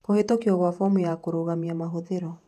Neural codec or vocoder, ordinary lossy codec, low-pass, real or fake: vocoder, 48 kHz, 128 mel bands, Vocos; none; 14.4 kHz; fake